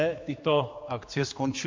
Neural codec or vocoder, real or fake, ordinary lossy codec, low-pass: codec, 16 kHz, 2 kbps, X-Codec, HuBERT features, trained on general audio; fake; MP3, 48 kbps; 7.2 kHz